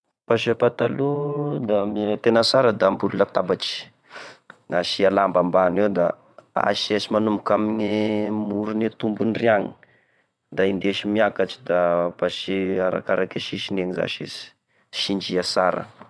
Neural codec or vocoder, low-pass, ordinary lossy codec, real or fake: vocoder, 22.05 kHz, 80 mel bands, WaveNeXt; none; none; fake